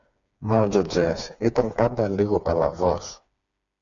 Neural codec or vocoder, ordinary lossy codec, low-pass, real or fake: codec, 16 kHz, 4 kbps, FreqCodec, smaller model; MP3, 64 kbps; 7.2 kHz; fake